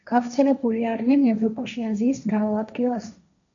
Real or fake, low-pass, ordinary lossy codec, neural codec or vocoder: fake; 7.2 kHz; MP3, 96 kbps; codec, 16 kHz, 1.1 kbps, Voila-Tokenizer